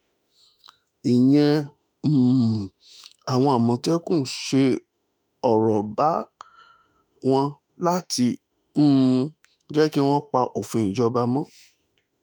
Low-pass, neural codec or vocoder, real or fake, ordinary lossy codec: 19.8 kHz; autoencoder, 48 kHz, 32 numbers a frame, DAC-VAE, trained on Japanese speech; fake; none